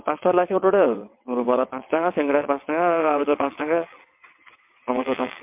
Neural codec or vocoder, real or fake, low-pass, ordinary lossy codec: vocoder, 22.05 kHz, 80 mel bands, WaveNeXt; fake; 3.6 kHz; MP3, 32 kbps